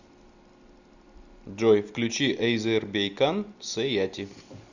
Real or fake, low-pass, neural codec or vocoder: real; 7.2 kHz; none